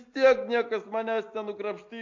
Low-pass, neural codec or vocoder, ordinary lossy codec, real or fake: 7.2 kHz; none; MP3, 48 kbps; real